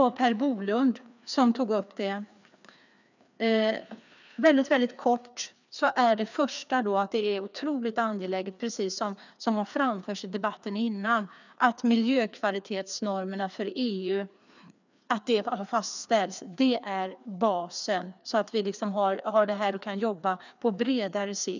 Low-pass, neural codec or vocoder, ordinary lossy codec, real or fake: 7.2 kHz; codec, 16 kHz, 2 kbps, FreqCodec, larger model; none; fake